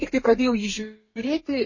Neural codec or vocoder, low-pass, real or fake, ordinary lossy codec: codec, 32 kHz, 1.9 kbps, SNAC; 7.2 kHz; fake; MP3, 32 kbps